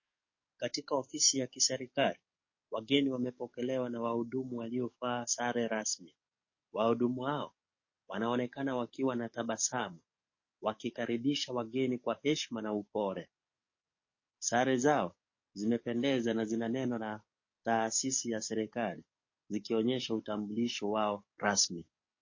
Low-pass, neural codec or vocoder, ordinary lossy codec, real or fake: 7.2 kHz; codec, 44.1 kHz, 7.8 kbps, DAC; MP3, 32 kbps; fake